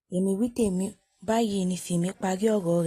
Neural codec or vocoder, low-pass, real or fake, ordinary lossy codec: none; 14.4 kHz; real; AAC, 48 kbps